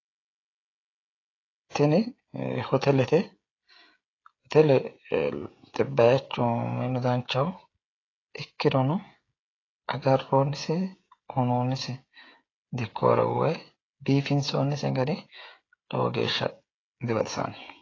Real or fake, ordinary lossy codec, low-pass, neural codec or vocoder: fake; AAC, 32 kbps; 7.2 kHz; codec, 16 kHz, 16 kbps, FreqCodec, smaller model